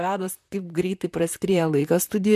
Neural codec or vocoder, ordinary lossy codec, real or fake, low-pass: vocoder, 44.1 kHz, 128 mel bands, Pupu-Vocoder; MP3, 96 kbps; fake; 14.4 kHz